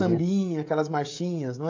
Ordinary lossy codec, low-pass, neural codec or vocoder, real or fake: AAC, 48 kbps; 7.2 kHz; codec, 16 kHz, 16 kbps, FreqCodec, smaller model; fake